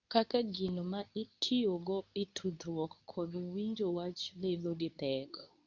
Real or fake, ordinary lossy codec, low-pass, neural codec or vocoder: fake; none; 7.2 kHz; codec, 24 kHz, 0.9 kbps, WavTokenizer, medium speech release version 2